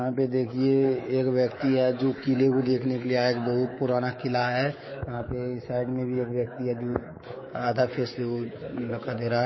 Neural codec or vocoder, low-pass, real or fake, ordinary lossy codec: codec, 24 kHz, 3.1 kbps, DualCodec; 7.2 kHz; fake; MP3, 24 kbps